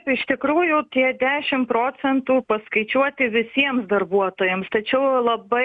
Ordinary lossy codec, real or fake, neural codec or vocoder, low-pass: MP3, 64 kbps; real; none; 10.8 kHz